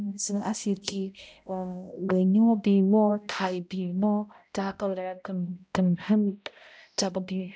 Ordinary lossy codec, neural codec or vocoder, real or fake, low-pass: none; codec, 16 kHz, 0.5 kbps, X-Codec, HuBERT features, trained on balanced general audio; fake; none